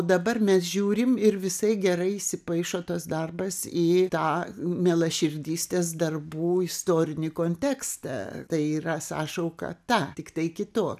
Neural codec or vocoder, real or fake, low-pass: none; real; 14.4 kHz